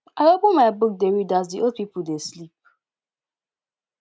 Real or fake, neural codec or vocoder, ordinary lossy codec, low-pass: real; none; none; none